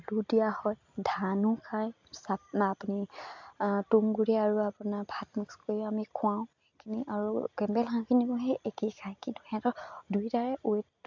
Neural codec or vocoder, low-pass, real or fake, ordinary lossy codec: none; 7.2 kHz; real; MP3, 64 kbps